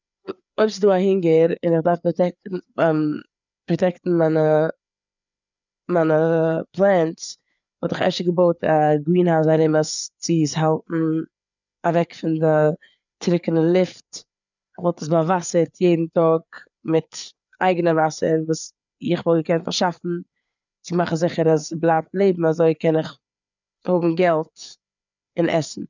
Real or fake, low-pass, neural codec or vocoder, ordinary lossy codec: fake; 7.2 kHz; codec, 16 kHz, 4 kbps, FreqCodec, larger model; none